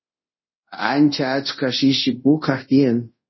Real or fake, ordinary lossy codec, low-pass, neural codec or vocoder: fake; MP3, 24 kbps; 7.2 kHz; codec, 24 kHz, 0.5 kbps, DualCodec